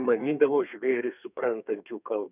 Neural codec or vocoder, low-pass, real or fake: codec, 32 kHz, 1.9 kbps, SNAC; 3.6 kHz; fake